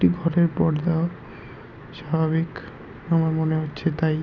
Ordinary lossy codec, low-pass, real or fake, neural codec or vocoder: none; 7.2 kHz; real; none